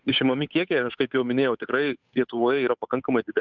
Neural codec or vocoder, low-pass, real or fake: codec, 16 kHz, 8 kbps, FunCodec, trained on Chinese and English, 25 frames a second; 7.2 kHz; fake